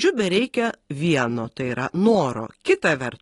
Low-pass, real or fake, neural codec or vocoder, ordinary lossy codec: 10.8 kHz; real; none; AAC, 32 kbps